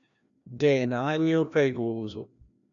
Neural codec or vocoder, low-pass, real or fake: codec, 16 kHz, 1 kbps, FreqCodec, larger model; 7.2 kHz; fake